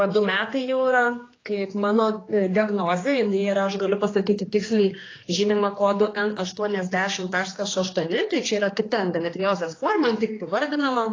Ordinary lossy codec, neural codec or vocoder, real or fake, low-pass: AAC, 32 kbps; codec, 16 kHz, 2 kbps, X-Codec, HuBERT features, trained on general audio; fake; 7.2 kHz